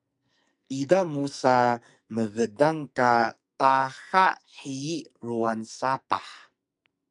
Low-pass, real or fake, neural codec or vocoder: 10.8 kHz; fake; codec, 44.1 kHz, 2.6 kbps, SNAC